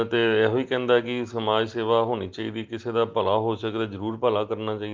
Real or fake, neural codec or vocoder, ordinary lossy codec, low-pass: real; none; Opus, 32 kbps; 7.2 kHz